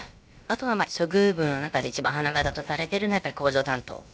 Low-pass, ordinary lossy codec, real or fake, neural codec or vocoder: none; none; fake; codec, 16 kHz, about 1 kbps, DyCAST, with the encoder's durations